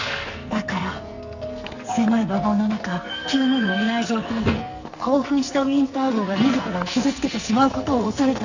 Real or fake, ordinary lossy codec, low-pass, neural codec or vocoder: fake; Opus, 64 kbps; 7.2 kHz; codec, 44.1 kHz, 2.6 kbps, SNAC